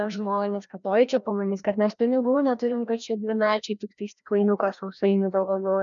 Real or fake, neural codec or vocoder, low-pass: fake; codec, 16 kHz, 1 kbps, FreqCodec, larger model; 7.2 kHz